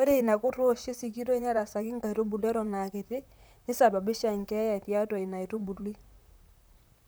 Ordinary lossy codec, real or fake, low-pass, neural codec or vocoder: none; fake; none; vocoder, 44.1 kHz, 128 mel bands, Pupu-Vocoder